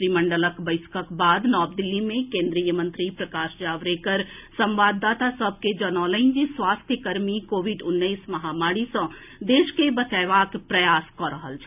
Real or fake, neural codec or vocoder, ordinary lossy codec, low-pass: real; none; none; 3.6 kHz